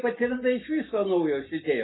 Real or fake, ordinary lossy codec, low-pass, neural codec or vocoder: real; AAC, 16 kbps; 7.2 kHz; none